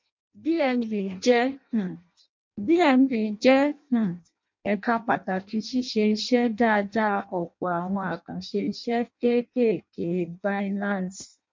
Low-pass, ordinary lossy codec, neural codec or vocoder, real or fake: 7.2 kHz; MP3, 48 kbps; codec, 16 kHz in and 24 kHz out, 0.6 kbps, FireRedTTS-2 codec; fake